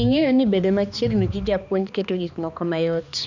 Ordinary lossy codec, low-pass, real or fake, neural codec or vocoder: none; 7.2 kHz; fake; codec, 16 kHz, 2 kbps, X-Codec, HuBERT features, trained on balanced general audio